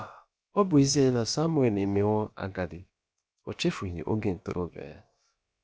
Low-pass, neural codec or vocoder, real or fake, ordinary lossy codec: none; codec, 16 kHz, about 1 kbps, DyCAST, with the encoder's durations; fake; none